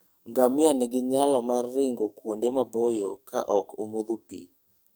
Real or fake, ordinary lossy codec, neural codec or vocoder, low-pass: fake; none; codec, 44.1 kHz, 2.6 kbps, SNAC; none